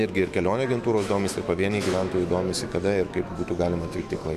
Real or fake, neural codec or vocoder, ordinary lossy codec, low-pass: fake; autoencoder, 48 kHz, 128 numbers a frame, DAC-VAE, trained on Japanese speech; MP3, 96 kbps; 14.4 kHz